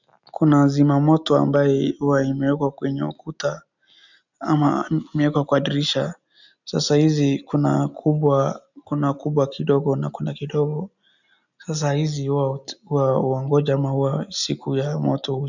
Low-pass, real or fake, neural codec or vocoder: 7.2 kHz; real; none